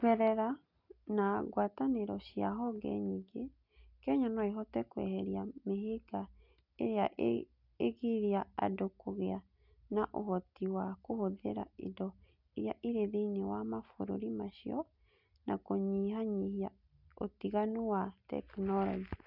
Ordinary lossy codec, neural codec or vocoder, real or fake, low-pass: none; none; real; 5.4 kHz